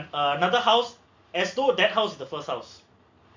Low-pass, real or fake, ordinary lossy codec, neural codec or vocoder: 7.2 kHz; real; MP3, 48 kbps; none